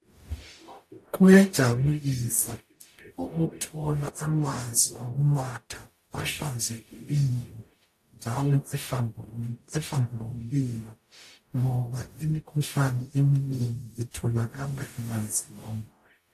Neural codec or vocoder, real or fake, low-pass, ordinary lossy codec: codec, 44.1 kHz, 0.9 kbps, DAC; fake; 14.4 kHz; AAC, 64 kbps